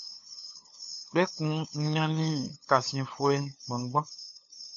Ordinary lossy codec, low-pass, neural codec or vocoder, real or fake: MP3, 64 kbps; 7.2 kHz; codec, 16 kHz, 2 kbps, FunCodec, trained on LibriTTS, 25 frames a second; fake